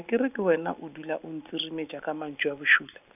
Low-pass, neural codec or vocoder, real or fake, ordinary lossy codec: 3.6 kHz; none; real; none